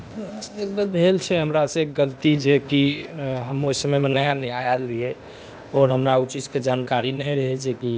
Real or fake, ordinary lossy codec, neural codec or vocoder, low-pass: fake; none; codec, 16 kHz, 0.8 kbps, ZipCodec; none